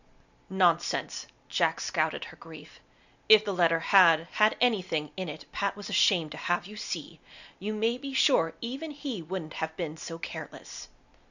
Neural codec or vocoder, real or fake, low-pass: none; real; 7.2 kHz